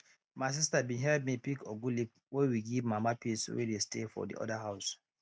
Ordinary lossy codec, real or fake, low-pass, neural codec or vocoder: none; real; none; none